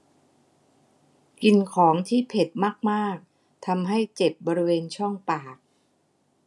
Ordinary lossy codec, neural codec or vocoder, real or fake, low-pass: none; none; real; none